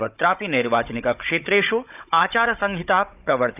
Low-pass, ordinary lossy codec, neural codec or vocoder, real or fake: 3.6 kHz; none; codec, 16 kHz, 16 kbps, FunCodec, trained on Chinese and English, 50 frames a second; fake